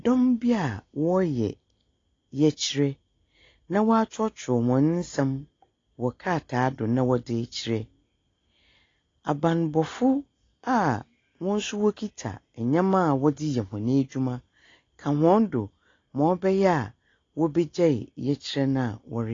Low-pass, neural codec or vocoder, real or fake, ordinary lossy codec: 7.2 kHz; none; real; AAC, 32 kbps